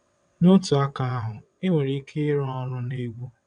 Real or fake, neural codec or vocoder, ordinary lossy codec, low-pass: fake; vocoder, 22.05 kHz, 80 mel bands, WaveNeXt; none; 9.9 kHz